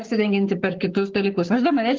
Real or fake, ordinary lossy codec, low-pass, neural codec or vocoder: fake; Opus, 16 kbps; 7.2 kHz; codec, 44.1 kHz, 7.8 kbps, Pupu-Codec